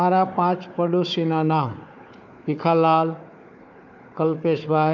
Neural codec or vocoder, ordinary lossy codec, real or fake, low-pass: codec, 16 kHz, 4 kbps, FunCodec, trained on Chinese and English, 50 frames a second; none; fake; 7.2 kHz